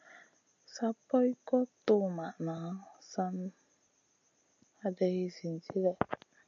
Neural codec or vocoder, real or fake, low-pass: none; real; 7.2 kHz